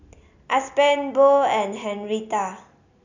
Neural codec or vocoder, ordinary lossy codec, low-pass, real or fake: none; none; 7.2 kHz; real